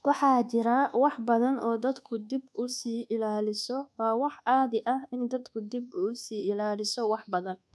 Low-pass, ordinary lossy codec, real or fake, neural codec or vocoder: none; none; fake; codec, 24 kHz, 1.2 kbps, DualCodec